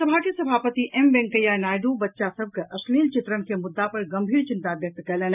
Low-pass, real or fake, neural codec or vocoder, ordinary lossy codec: 3.6 kHz; real; none; none